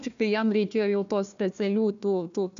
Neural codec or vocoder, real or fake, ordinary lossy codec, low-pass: codec, 16 kHz, 1 kbps, FunCodec, trained on Chinese and English, 50 frames a second; fake; MP3, 96 kbps; 7.2 kHz